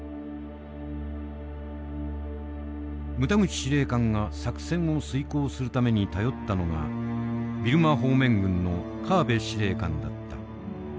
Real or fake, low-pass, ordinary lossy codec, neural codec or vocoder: real; none; none; none